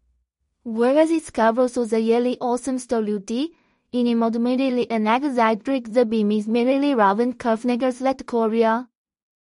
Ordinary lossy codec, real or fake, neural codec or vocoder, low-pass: MP3, 48 kbps; fake; codec, 16 kHz in and 24 kHz out, 0.4 kbps, LongCat-Audio-Codec, two codebook decoder; 10.8 kHz